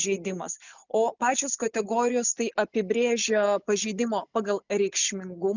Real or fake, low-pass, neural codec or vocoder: real; 7.2 kHz; none